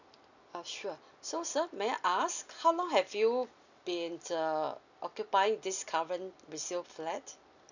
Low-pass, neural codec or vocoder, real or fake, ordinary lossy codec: 7.2 kHz; none; real; none